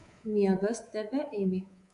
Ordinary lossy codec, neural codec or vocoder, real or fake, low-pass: MP3, 64 kbps; codec, 24 kHz, 3.1 kbps, DualCodec; fake; 10.8 kHz